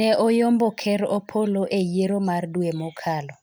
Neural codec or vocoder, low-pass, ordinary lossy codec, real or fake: none; none; none; real